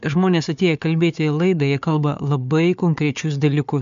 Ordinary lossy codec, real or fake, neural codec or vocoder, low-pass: MP3, 64 kbps; fake; codec, 16 kHz, 4 kbps, FunCodec, trained on Chinese and English, 50 frames a second; 7.2 kHz